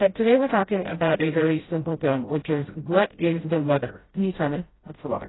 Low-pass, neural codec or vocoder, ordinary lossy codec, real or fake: 7.2 kHz; codec, 16 kHz, 0.5 kbps, FreqCodec, smaller model; AAC, 16 kbps; fake